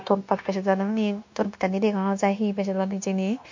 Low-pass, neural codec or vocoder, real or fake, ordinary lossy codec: 7.2 kHz; codec, 16 kHz, 0.9 kbps, LongCat-Audio-Codec; fake; MP3, 48 kbps